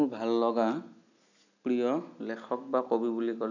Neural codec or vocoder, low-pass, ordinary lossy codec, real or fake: none; 7.2 kHz; none; real